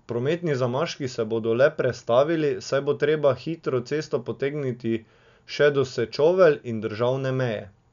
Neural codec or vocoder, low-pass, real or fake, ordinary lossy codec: none; 7.2 kHz; real; none